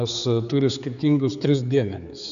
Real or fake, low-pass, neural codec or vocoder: fake; 7.2 kHz; codec, 16 kHz, 4 kbps, X-Codec, HuBERT features, trained on general audio